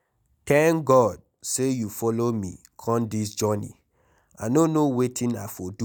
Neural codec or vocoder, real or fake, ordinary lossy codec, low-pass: none; real; none; none